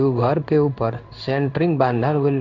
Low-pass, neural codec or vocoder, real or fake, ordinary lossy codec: 7.2 kHz; codec, 16 kHz in and 24 kHz out, 1 kbps, XY-Tokenizer; fake; none